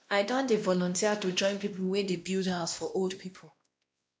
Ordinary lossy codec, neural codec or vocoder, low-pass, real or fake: none; codec, 16 kHz, 1 kbps, X-Codec, WavLM features, trained on Multilingual LibriSpeech; none; fake